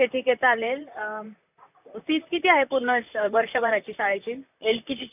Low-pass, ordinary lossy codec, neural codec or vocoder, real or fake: 3.6 kHz; none; vocoder, 44.1 kHz, 128 mel bands, Pupu-Vocoder; fake